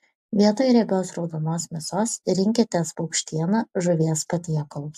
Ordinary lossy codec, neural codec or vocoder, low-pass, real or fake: AAC, 96 kbps; none; 14.4 kHz; real